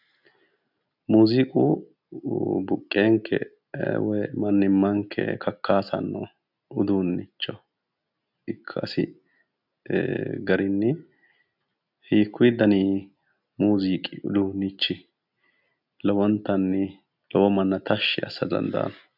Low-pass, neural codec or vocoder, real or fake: 5.4 kHz; none; real